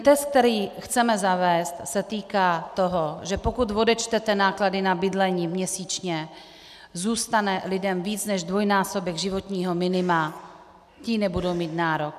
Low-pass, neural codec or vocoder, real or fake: 14.4 kHz; none; real